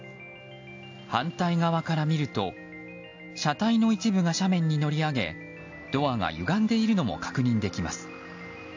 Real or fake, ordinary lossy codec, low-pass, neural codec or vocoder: real; AAC, 48 kbps; 7.2 kHz; none